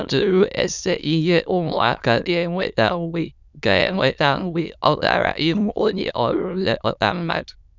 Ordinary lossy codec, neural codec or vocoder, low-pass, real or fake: none; autoencoder, 22.05 kHz, a latent of 192 numbers a frame, VITS, trained on many speakers; 7.2 kHz; fake